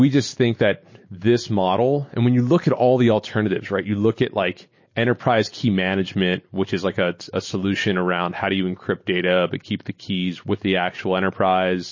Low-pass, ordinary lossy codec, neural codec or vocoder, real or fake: 7.2 kHz; MP3, 32 kbps; none; real